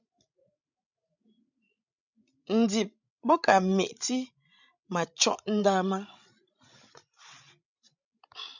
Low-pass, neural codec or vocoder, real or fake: 7.2 kHz; codec, 16 kHz, 16 kbps, FreqCodec, larger model; fake